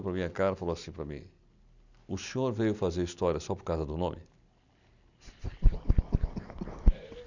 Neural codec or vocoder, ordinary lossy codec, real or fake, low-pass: none; none; real; 7.2 kHz